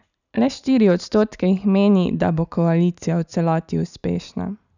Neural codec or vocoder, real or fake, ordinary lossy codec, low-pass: none; real; none; 7.2 kHz